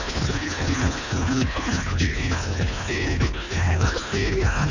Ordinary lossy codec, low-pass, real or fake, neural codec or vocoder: none; 7.2 kHz; fake; codec, 24 kHz, 1.5 kbps, HILCodec